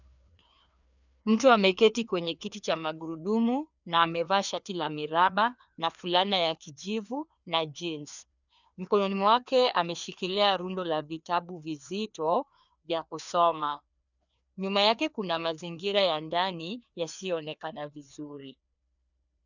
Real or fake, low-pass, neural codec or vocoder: fake; 7.2 kHz; codec, 16 kHz, 2 kbps, FreqCodec, larger model